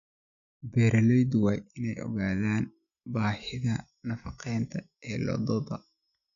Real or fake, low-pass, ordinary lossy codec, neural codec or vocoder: real; 7.2 kHz; none; none